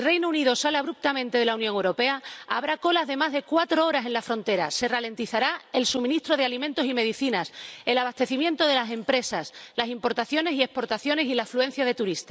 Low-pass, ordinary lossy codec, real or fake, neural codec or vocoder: none; none; real; none